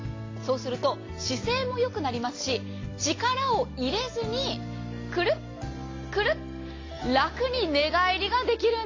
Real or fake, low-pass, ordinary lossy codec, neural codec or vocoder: real; 7.2 kHz; AAC, 32 kbps; none